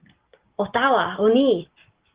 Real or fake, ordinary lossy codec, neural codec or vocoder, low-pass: real; Opus, 16 kbps; none; 3.6 kHz